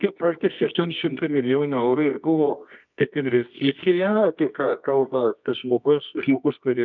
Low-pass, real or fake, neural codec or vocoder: 7.2 kHz; fake; codec, 24 kHz, 0.9 kbps, WavTokenizer, medium music audio release